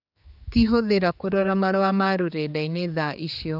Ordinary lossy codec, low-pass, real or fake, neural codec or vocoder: none; 5.4 kHz; fake; codec, 16 kHz, 4 kbps, X-Codec, HuBERT features, trained on general audio